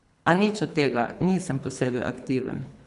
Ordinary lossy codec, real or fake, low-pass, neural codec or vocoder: none; fake; 10.8 kHz; codec, 24 kHz, 3 kbps, HILCodec